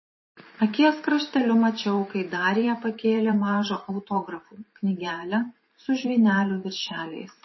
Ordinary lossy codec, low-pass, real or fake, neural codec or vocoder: MP3, 24 kbps; 7.2 kHz; fake; vocoder, 44.1 kHz, 128 mel bands every 512 samples, BigVGAN v2